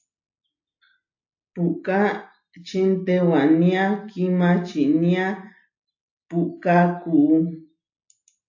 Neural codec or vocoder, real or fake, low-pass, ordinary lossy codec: none; real; 7.2 kHz; AAC, 48 kbps